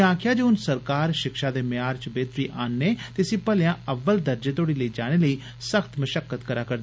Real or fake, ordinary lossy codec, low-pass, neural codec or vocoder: real; none; none; none